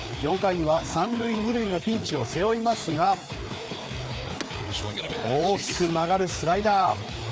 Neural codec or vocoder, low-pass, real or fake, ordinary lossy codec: codec, 16 kHz, 4 kbps, FreqCodec, larger model; none; fake; none